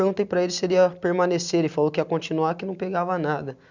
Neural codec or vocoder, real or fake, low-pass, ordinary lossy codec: none; real; 7.2 kHz; none